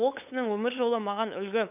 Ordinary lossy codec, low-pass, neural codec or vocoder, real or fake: none; 3.6 kHz; codec, 16 kHz, 8 kbps, FunCodec, trained on LibriTTS, 25 frames a second; fake